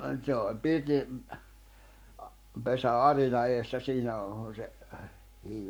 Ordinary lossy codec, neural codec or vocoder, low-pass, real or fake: none; codec, 44.1 kHz, 7.8 kbps, Pupu-Codec; none; fake